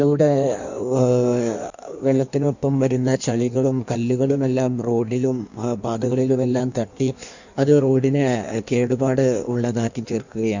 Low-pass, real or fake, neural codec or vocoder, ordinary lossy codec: 7.2 kHz; fake; codec, 16 kHz in and 24 kHz out, 1.1 kbps, FireRedTTS-2 codec; none